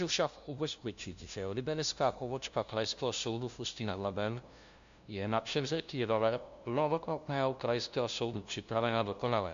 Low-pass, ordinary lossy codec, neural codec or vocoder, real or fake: 7.2 kHz; MP3, 64 kbps; codec, 16 kHz, 0.5 kbps, FunCodec, trained on LibriTTS, 25 frames a second; fake